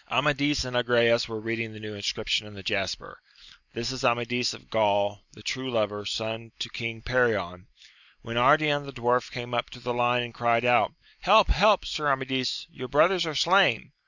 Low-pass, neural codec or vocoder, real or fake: 7.2 kHz; none; real